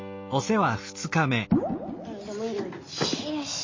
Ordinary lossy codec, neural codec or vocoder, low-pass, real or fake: MP3, 32 kbps; none; 7.2 kHz; real